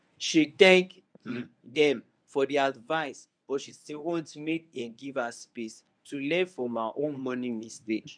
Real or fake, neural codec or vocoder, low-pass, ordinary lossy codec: fake; codec, 24 kHz, 0.9 kbps, WavTokenizer, medium speech release version 1; 9.9 kHz; none